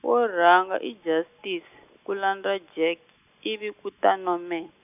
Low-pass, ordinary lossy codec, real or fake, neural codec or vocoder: 3.6 kHz; none; real; none